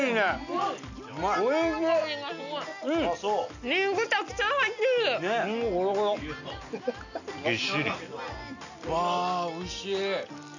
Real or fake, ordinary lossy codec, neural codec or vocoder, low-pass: real; none; none; 7.2 kHz